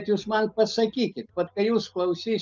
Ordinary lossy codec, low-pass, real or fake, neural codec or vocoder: Opus, 32 kbps; 7.2 kHz; real; none